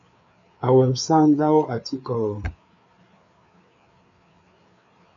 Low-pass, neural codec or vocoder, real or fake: 7.2 kHz; codec, 16 kHz, 4 kbps, FreqCodec, larger model; fake